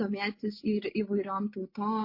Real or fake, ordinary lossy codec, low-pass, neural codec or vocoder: real; MP3, 32 kbps; 5.4 kHz; none